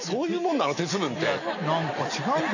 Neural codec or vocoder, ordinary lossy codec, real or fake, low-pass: none; none; real; 7.2 kHz